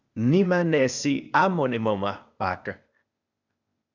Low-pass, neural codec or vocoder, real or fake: 7.2 kHz; codec, 16 kHz, 0.8 kbps, ZipCodec; fake